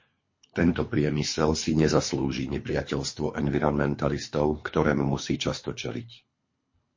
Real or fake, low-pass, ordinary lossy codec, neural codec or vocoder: fake; 7.2 kHz; MP3, 32 kbps; codec, 24 kHz, 3 kbps, HILCodec